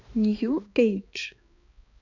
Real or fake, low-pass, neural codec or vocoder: fake; 7.2 kHz; codec, 16 kHz, 2 kbps, X-Codec, HuBERT features, trained on balanced general audio